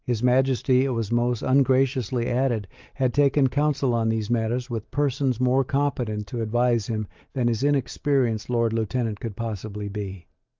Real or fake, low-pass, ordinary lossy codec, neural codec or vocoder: real; 7.2 kHz; Opus, 24 kbps; none